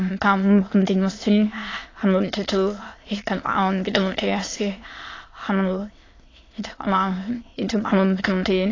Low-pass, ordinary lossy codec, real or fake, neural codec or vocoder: 7.2 kHz; AAC, 32 kbps; fake; autoencoder, 22.05 kHz, a latent of 192 numbers a frame, VITS, trained on many speakers